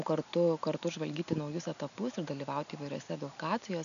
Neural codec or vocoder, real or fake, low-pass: none; real; 7.2 kHz